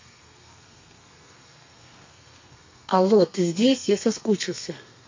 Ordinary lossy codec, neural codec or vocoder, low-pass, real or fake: MP3, 48 kbps; codec, 32 kHz, 1.9 kbps, SNAC; 7.2 kHz; fake